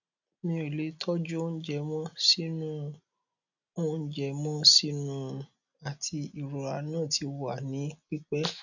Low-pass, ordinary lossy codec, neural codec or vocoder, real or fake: 7.2 kHz; none; none; real